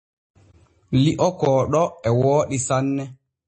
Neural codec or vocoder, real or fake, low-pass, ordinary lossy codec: none; real; 10.8 kHz; MP3, 32 kbps